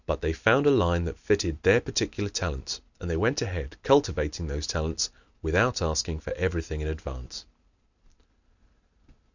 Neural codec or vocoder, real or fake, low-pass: none; real; 7.2 kHz